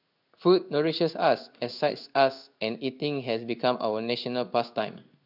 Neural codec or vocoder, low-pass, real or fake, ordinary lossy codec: codec, 16 kHz in and 24 kHz out, 1 kbps, XY-Tokenizer; 5.4 kHz; fake; none